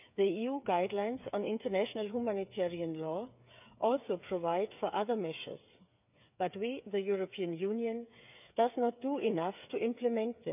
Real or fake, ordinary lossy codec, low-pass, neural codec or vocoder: fake; none; 3.6 kHz; codec, 16 kHz, 8 kbps, FreqCodec, smaller model